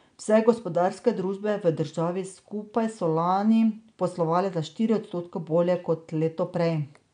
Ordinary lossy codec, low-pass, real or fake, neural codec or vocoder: none; 9.9 kHz; real; none